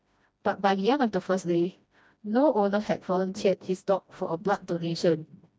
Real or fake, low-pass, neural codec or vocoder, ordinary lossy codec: fake; none; codec, 16 kHz, 1 kbps, FreqCodec, smaller model; none